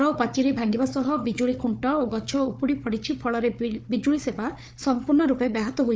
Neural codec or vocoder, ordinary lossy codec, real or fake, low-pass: codec, 16 kHz, 4 kbps, FunCodec, trained on Chinese and English, 50 frames a second; none; fake; none